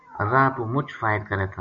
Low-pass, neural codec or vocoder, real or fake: 7.2 kHz; none; real